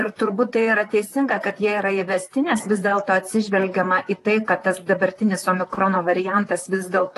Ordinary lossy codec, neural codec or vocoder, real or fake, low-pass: AAC, 48 kbps; vocoder, 44.1 kHz, 128 mel bands, Pupu-Vocoder; fake; 14.4 kHz